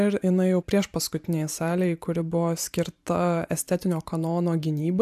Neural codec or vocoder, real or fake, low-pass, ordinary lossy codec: vocoder, 44.1 kHz, 128 mel bands every 512 samples, BigVGAN v2; fake; 14.4 kHz; AAC, 96 kbps